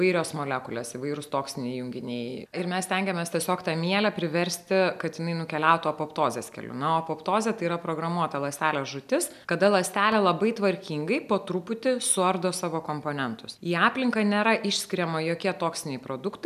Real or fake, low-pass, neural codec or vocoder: real; 14.4 kHz; none